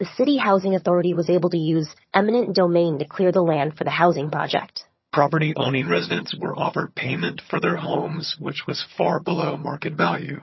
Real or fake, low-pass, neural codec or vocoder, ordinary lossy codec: fake; 7.2 kHz; vocoder, 22.05 kHz, 80 mel bands, HiFi-GAN; MP3, 24 kbps